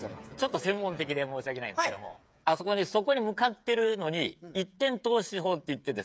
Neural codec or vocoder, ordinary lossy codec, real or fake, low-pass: codec, 16 kHz, 8 kbps, FreqCodec, smaller model; none; fake; none